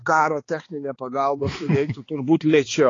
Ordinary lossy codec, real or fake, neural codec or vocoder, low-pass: AAC, 32 kbps; fake; codec, 16 kHz, 2 kbps, X-Codec, HuBERT features, trained on balanced general audio; 7.2 kHz